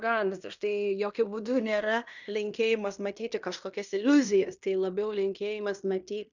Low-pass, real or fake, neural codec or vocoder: 7.2 kHz; fake; codec, 16 kHz in and 24 kHz out, 0.9 kbps, LongCat-Audio-Codec, fine tuned four codebook decoder